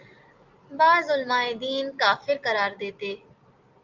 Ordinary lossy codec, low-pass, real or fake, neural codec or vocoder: Opus, 24 kbps; 7.2 kHz; real; none